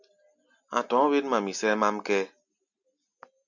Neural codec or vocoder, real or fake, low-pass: none; real; 7.2 kHz